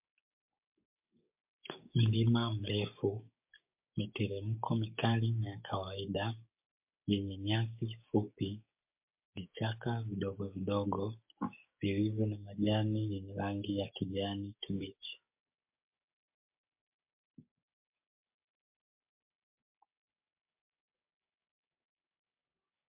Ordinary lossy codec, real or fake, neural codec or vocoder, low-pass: MP3, 32 kbps; fake; codec, 16 kHz, 6 kbps, DAC; 3.6 kHz